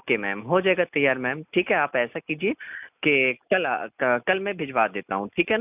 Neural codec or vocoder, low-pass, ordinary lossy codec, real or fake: none; 3.6 kHz; none; real